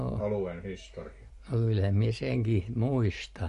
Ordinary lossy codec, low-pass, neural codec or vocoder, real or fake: MP3, 48 kbps; 14.4 kHz; none; real